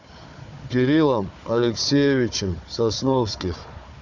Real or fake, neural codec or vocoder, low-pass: fake; codec, 16 kHz, 4 kbps, FunCodec, trained on Chinese and English, 50 frames a second; 7.2 kHz